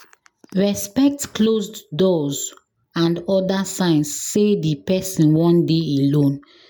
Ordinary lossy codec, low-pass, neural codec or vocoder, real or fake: none; none; none; real